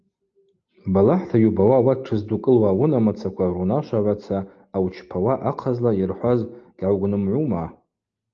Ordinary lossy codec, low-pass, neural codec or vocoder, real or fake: Opus, 24 kbps; 7.2 kHz; none; real